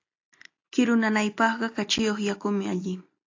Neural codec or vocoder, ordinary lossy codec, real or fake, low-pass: none; AAC, 48 kbps; real; 7.2 kHz